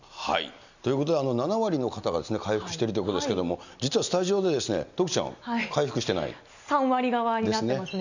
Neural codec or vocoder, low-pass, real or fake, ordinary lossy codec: none; 7.2 kHz; real; none